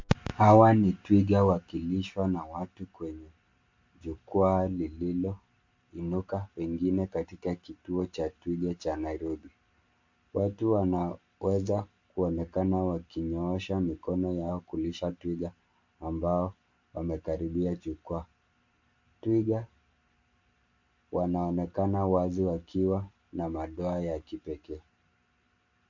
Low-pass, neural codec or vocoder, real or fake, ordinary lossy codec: 7.2 kHz; none; real; MP3, 48 kbps